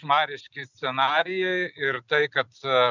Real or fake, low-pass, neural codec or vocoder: real; 7.2 kHz; none